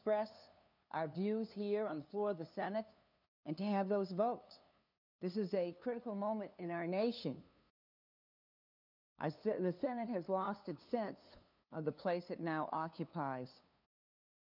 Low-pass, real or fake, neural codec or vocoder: 5.4 kHz; fake; codec, 16 kHz, 2 kbps, FunCodec, trained on LibriTTS, 25 frames a second